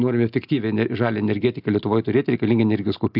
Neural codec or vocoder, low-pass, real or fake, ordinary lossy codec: none; 5.4 kHz; real; AAC, 48 kbps